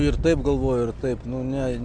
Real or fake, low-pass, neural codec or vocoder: real; 9.9 kHz; none